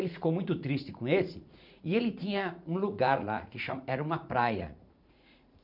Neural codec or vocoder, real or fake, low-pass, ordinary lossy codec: vocoder, 44.1 kHz, 80 mel bands, Vocos; fake; 5.4 kHz; none